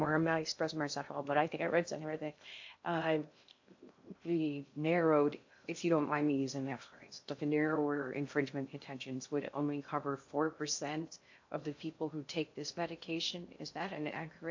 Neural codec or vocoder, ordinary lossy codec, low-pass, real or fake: codec, 16 kHz in and 24 kHz out, 0.6 kbps, FocalCodec, streaming, 2048 codes; AAC, 48 kbps; 7.2 kHz; fake